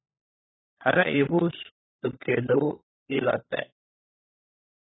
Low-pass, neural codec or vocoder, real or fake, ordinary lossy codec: 7.2 kHz; codec, 16 kHz, 4 kbps, FunCodec, trained on LibriTTS, 50 frames a second; fake; AAC, 16 kbps